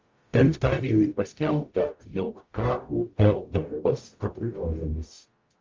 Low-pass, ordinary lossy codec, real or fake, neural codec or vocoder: 7.2 kHz; Opus, 32 kbps; fake; codec, 44.1 kHz, 0.9 kbps, DAC